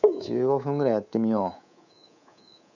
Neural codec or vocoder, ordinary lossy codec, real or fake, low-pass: codec, 24 kHz, 3.1 kbps, DualCodec; none; fake; 7.2 kHz